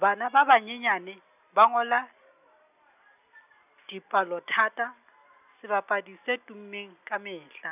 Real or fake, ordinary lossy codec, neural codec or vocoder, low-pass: real; none; none; 3.6 kHz